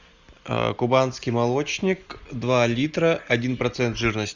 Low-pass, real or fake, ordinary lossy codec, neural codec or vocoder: 7.2 kHz; real; Opus, 64 kbps; none